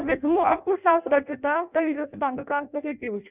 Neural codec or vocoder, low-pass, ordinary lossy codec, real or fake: codec, 16 kHz in and 24 kHz out, 0.6 kbps, FireRedTTS-2 codec; 3.6 kHz; none; fake